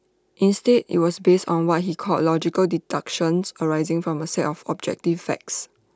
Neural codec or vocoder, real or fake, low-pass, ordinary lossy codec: none; real; none; none